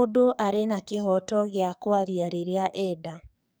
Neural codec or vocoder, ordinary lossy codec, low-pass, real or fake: codec, 44.1 kHz, 2.6 kbps, SNAC; none; none; fake